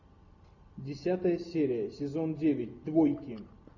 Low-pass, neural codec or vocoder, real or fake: 7.2 kHz; none; real